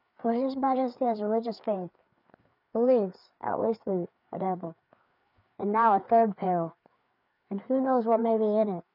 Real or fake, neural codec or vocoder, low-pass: fake; codec, 16 kHz, 4 kbps, FreqCodec, larger model; 5.4 kHz